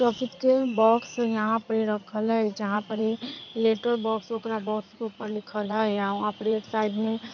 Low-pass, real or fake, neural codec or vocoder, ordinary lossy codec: 7.2 kHz; fake; codec, 16 kHz in and 24 kHz out, 2.2 kbps, FireRedTTS-2 codec; none